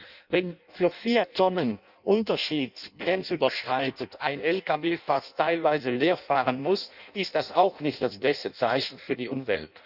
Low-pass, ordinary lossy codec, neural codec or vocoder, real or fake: 5.4 kHz; none; codec, 16 kHz in and 24 kHz out, 0.6 kbps, FireRedTTS-2 codec; fake